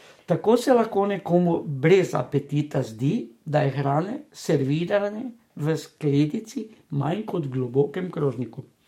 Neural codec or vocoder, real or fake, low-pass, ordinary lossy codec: codec, 44.1 kHz, 7.8 kbps, DAC; fake; 19.8 kHz; MP3, 64 kbps